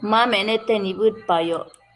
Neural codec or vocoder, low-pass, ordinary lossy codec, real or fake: none; 10.8 kHz; Opus, 32 kbps; real